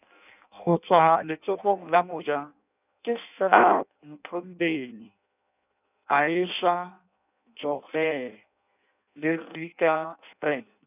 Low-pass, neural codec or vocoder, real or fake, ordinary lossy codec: 3.6 kHz; codec, 16 kHz in and 24 kHz out, 0.6 kbps, FireRedTTS-2 codec; fake; none